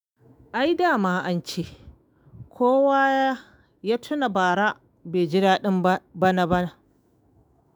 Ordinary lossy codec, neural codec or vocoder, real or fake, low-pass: none; autoencoder, 48 kHz, 128 numbers a frame, DAC-VAE, trained on Japanese speech; fake; none